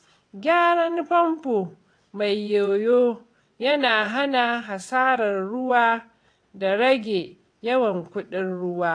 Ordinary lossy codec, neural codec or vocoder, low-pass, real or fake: AAC, 48 kbps; vocoder, 22.05 kHz, 80 mel bands, WaveNeXt; 9.9 kHz; fake